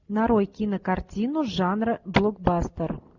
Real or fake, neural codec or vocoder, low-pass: real; none; 7.2 kHz